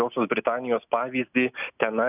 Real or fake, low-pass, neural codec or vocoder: real; 3.6 kHz; none